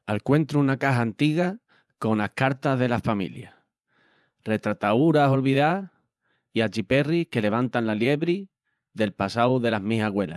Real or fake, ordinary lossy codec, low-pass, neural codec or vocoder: fake; none; none; vocoder, 24 kHz, 100 mel bands, Vocos